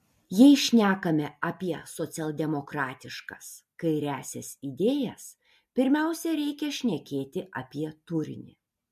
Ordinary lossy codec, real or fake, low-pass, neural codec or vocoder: MP3, 64 kbps; real; 14.4 kHz; none